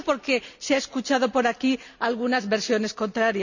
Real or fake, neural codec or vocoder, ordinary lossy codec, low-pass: real; none; none; 7.2 kHz